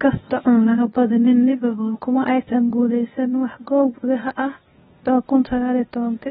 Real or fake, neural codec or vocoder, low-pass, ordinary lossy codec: fake; codec, 16 kHz, 0.8 kbps, ZipCodec; 7.2 kHz; AAC, 16 kbps